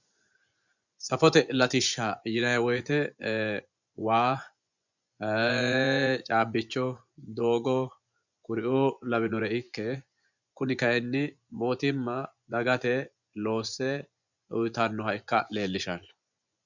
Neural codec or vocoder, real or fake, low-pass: vocoder, 24 kHz, 100 mel bands, Vocos; fake; 7.2 kHz